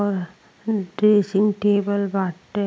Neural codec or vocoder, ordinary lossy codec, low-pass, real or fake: none; none; none; real